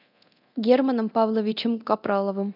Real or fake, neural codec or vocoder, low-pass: fake; codec, 24 kHz, 0.9 kbps, DualCodec; 5.4 kHz